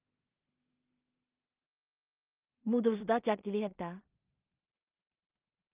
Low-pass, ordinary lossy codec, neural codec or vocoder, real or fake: 3.6 kHz; Opus, 32 kbps; codec, 16 kHz in and 24 kHz out, 0.4 kbps, LongCat-Audio-Codec, two codebook decoder; fake